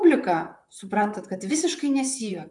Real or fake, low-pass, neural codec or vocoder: fake; 10.8 kHz; vocoder, 44.1 kHz, 128 mel bands, Pupu-Vocoder